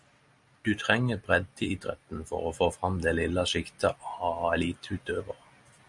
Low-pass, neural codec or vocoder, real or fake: 10.8 kHz; none; real